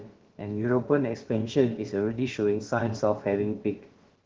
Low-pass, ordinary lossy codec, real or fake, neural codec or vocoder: 7.2 kHz; Opus, 16 kbps; fake; codec, 16 kHz, about 1 kbps, DyCAST, with the encoder's durations